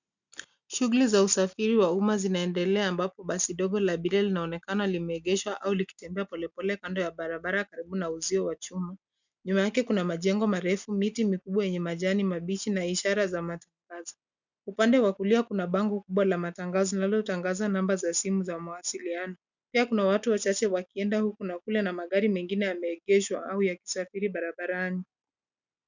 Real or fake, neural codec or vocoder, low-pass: real; none; 7.2 kHz